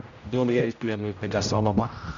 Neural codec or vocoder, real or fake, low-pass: codec, 16 kHz, 0.5 kbps, X-Codec, HuBERT features, trained on general audio; fake; 7.2 kHz